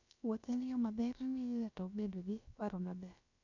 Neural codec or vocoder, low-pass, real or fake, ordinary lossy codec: codec, 16 kHz, about 1 kbps, DyCAST, with the encoder's durations; 7.2 kHz; fake; none